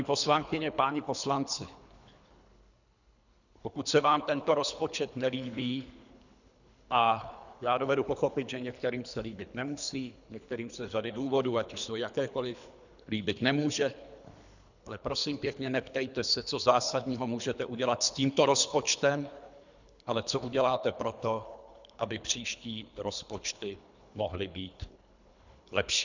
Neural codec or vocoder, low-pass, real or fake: codec, 24 kHz, 3 kbps, HILCodec; 7.2 kHz; fake